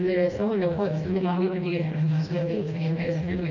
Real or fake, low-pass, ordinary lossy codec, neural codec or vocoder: fake; 7.2 kHz; none; codec, 16 kHz, 1 kbps, FreqCodec, smaller model